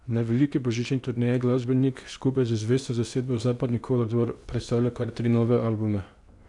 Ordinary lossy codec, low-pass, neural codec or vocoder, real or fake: none; 10.8 kHz; codec, 16 kHz in and 24 kHz out, 0.8 kbps, FocalCodec, streaming, 65536 codes; fake